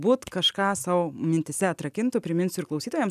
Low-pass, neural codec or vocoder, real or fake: 14.4 kHz; none; real